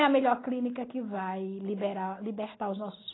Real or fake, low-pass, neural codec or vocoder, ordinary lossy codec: real; 7.2 kHz; none; AAC, 16 kbps